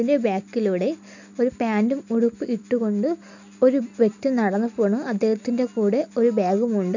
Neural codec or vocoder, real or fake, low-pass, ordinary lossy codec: none; real; 7.2 kHz; none